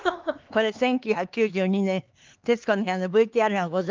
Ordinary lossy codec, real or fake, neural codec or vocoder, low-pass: Opus, 32 kbps; fake; codec, 16 kHz, 4 kbps, X-Codec, HuBERT features, trained on LibriSpeech; 7.2 kHz